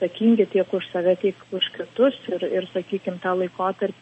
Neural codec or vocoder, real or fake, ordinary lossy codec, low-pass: none; real; MP3, 32 kbps; 9.9 kHz